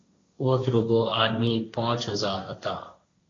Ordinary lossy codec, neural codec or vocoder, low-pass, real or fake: AAC, 32 kbps; codec, 16 kHz, 1.1 kbps, Voila-Tokenizer; 7.2 kHz; fake